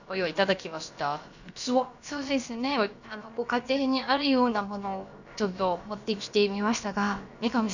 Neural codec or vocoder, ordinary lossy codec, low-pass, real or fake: codec, 16 kHz, about 1 kbps, DyCAST, with the encoder's durations; none; 7.2 kHz; fake